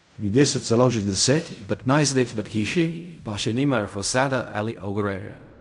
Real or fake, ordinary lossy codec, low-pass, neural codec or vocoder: fake; none; 10.8 kHz; codec, 16 kHz in and 24 kHz out, 0.4 kbps, LongCat-Audio-Codec, fine tuned four codebook decoder